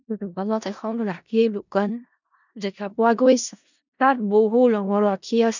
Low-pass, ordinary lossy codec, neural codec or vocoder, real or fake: 7.2 kHz; none; codec, 16 kHz in and 24 kHz out, 0.4 kbps, LongCat-Audio-Codec, four codebook decoder; fake